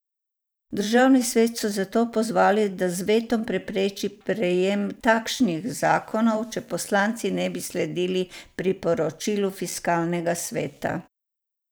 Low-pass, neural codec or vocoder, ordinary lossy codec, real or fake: none; vocoder, 44.1 kHz, 128 mel bands every 512 samples, BigVGAN v2; none; fake